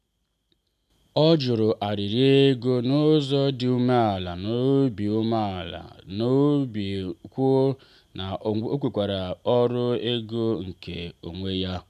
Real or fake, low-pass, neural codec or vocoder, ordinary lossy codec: real; 14.4 kHz; none; none